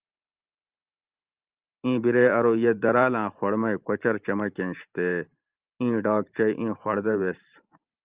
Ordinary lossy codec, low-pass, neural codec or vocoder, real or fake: Opus, 24 kbps; 3.6 kHz; vocoder, 44.1 kHz, 128 mel bands every 512 samples, BigVGAN v2; fake